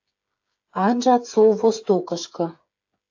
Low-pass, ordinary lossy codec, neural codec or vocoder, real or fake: 7.2 kHz; AAC, 48 kbps; codec, 16 kHz, 8 kbps, FreqCodec, smaller model; fake